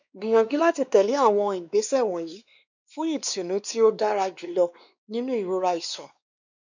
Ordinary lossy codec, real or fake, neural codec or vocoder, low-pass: none; fake; codec, 16 kHz, 2 kbps, X-Codec, WavLM features, trained on Multilingual LibriSpeech; 7.2 kHz